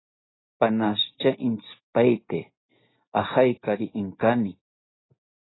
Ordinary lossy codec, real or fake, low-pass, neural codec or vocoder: AAC, 16 kbps; real; 7.2 kHz; none